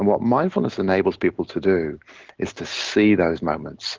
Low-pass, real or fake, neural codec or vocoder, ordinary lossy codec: 7.2 kHz; real; none; Opus, 16 kbps